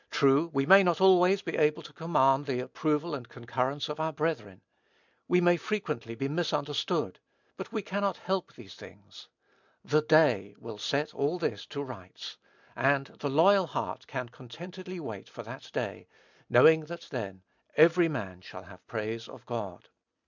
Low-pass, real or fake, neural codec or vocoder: 7.2 kHz; real; none